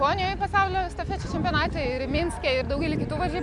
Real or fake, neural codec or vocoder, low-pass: real; none; 10.8 kHz